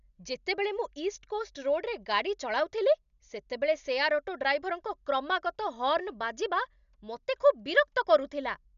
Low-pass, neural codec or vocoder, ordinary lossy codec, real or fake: 7.2 kHz; none; none; real